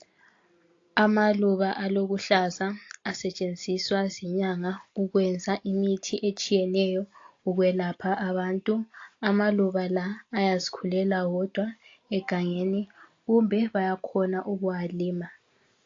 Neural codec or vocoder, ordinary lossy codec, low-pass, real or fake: none; AAC, 48 kbps; 7.2 kHz; real